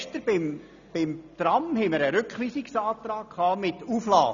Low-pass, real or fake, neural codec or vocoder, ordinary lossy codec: 7.2 kHz; real; none; none